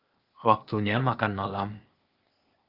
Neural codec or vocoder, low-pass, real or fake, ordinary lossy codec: codec, 16 kHz, 0.8 kbps, ZipCodec; 5.4 kHz; fake; Opus, 32 kbps